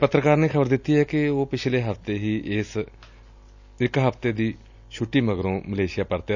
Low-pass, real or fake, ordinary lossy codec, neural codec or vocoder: 7.2 kHz; real; none; none